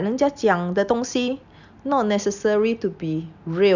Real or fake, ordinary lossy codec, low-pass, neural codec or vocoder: real; none; 7.2 kHz; none